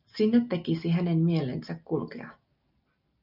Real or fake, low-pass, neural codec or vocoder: real; 5.4 kHz; none